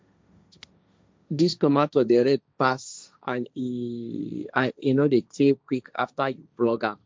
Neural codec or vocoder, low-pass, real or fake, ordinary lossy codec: codec, 16 kHz, 1.1 kbps, Voila-Tokenizer; none; fake; none